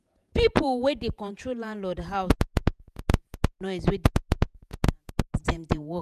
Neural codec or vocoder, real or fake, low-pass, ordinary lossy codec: vocoder, 48 kHz, 128 mel bands, Vocos; fake; 14.4 kHz; none